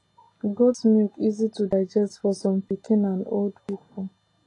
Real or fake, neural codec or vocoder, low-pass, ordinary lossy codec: real; none; 10.8 kHz; AAC, 32 kbps